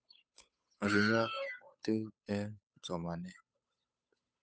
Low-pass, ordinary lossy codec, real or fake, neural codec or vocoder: none; none; fake; codec, 16 kHz, 8 kbps, FunCodec, trained on Chinese and English, 25 frames a second